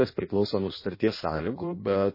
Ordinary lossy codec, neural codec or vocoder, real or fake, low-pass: MP3, 24 kbps; codec, 16 kHz in and 24 kHz out, 0.6 kbps, FireRedTTS-2 codec; fake; 5.4 kHz